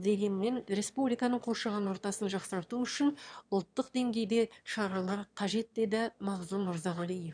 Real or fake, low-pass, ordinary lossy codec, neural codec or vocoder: fake; 9.9 kHz; none; autoencoder, 22.05 kHz, a latent of 192 numbers a frame, VITS, trained on one speaker